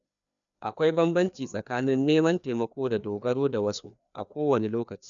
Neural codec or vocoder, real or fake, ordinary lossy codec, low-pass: codec, 16 kHz, 2 kbps, FreqCodec, larger model; fake; none; 7.2 kHz